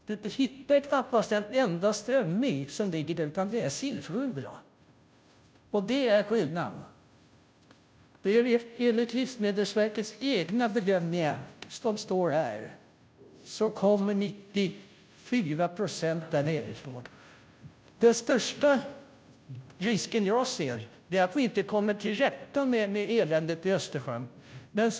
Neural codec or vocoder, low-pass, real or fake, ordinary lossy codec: codec, 16 kHz, 0.5 kbps, FunCodec, trained on Chinese and English, 25 frames a second; none; fake; none